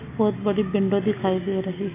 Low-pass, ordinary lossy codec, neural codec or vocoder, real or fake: 3.6 kHz; MP3, 24 kbps; none; real